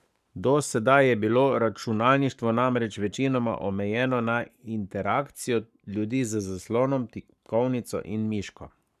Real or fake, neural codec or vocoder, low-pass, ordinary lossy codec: fake; codec, 44.1 kHz, 7.8 kbps, Pupu-Codec; 14.4 kHz; none